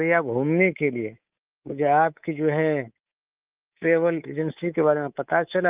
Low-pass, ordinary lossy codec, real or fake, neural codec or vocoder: 3.6 kHz; Opus, 24 kbps; fake; codec, 24 kHz, 3.1 kbps, DualCodec